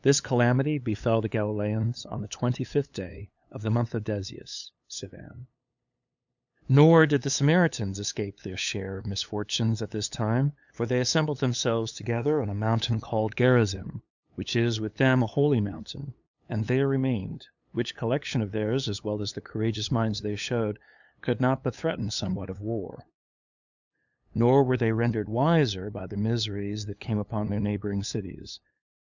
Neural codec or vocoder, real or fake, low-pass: codec, 16 kHz, 8 kbps, FunCodec, trained on LibriTTS, 25 frames a second; fake; 7.2 kHz